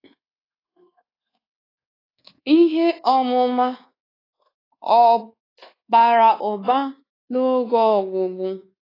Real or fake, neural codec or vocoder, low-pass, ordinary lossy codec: fake; codec, 24 kHz, 1.2 kbps, DualCodec; 5.4 kHz; AAC, 24 kbps